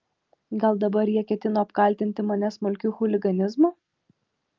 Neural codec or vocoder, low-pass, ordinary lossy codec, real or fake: none; 7.2 kHz; Opus, 32 kbps; real